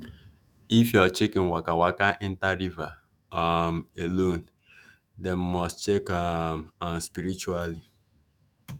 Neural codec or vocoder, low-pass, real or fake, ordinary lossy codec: autoencoder, 48 kHz, 128 numbers a frame, DAC-VAE, trained on Japanese speech; 19.8 kHz; fake; none